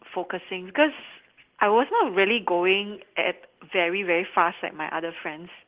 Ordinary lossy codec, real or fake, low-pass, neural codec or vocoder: Opus, 16 kbps; real; 3.6 kHz; none